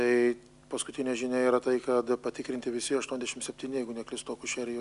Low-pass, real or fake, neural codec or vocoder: 10.8 kHz; real; none